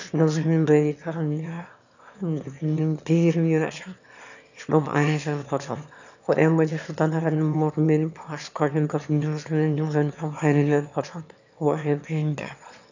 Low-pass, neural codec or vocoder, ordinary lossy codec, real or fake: 7.2 kHz; autoencoder, 22.05 kHz, a latent of 192 numbers a frame, VITS, trained on one speaker; none; fake